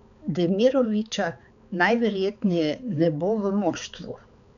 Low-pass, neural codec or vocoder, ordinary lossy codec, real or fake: 7.2 kHz; codec, 16 kHz, 4 kbps, X-Codec, HuBERT features, trained on balanced general audio; none; fake